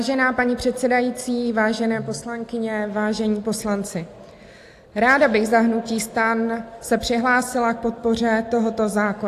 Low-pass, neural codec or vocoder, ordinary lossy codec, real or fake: 14.4 kHz; none; AAC, 64 kbps; real